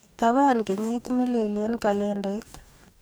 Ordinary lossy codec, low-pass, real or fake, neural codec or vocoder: none; none; fake; codec, 44.1 kHz, 2.6 kbps, DAC